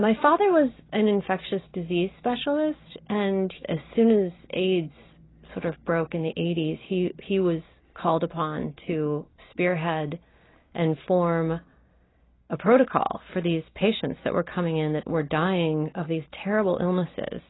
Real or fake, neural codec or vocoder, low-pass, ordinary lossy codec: real; none; 7.2 kHz; AAC, 16 kbps